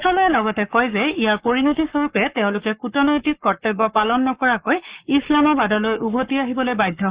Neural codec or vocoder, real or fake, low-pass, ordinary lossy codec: codec, 44.1 kHz, 7.8 kbps, Pupu-Codec; fake; 3.6 kHz; Opus, 64 kbps